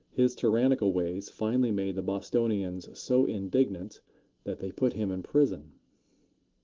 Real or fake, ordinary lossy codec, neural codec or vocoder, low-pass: real; Opus, 16 kbps; none; 7.2 kHz